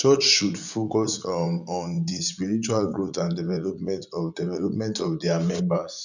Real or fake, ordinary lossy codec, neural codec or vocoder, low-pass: fake; none; vocoder, 44.1 kHz, 80 mel bands, Vocos; 7.2 kHz